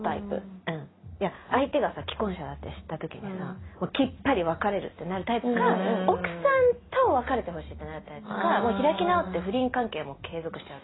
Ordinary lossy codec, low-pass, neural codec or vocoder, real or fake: AAC, 16 kbps; 7.2 kHz; none; real